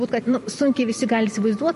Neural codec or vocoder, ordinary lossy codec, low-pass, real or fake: vocoder, 44.1 kHz, 128 mel bands every 256 samples, BigVGAN v2; MP3, 48 kbps; 14.4 kHz; fake